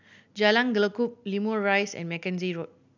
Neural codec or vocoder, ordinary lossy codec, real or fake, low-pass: none; none; real; 7.2 kHz